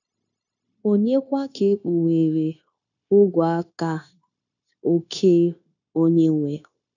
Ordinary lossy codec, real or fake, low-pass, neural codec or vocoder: none; fake; 7.2 kHz; codec, 16 kHz, 0.9 kbps, LongCat-Audio-Codec